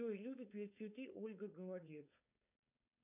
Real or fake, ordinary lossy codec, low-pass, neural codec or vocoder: fake; MP3, 32 kbps; 3.6 kHz; codec, 16 kHz, 4.8 kbps, FACodec